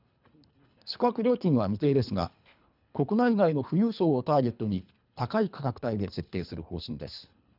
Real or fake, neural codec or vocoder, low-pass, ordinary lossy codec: fake; codec, 24 kHz, 3 kbps, HILCodec; 5.4 kHz; none